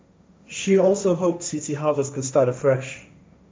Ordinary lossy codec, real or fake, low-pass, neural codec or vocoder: none; fake; none; codec, 16 kHz, 1.1 kbps, Voila-Tokenizer